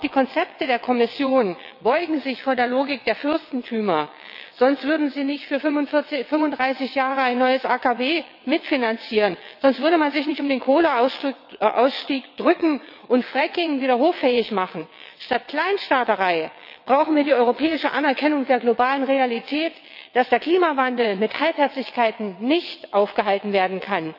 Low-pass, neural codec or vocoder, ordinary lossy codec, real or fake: 5.4 kHz; vocoder, 22.05 kHz, 80 mel bands, WaveNeXt; none; fake